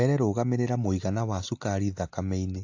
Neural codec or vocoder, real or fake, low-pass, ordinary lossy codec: none; real; 7.2 kHz; AAC, 48 kbps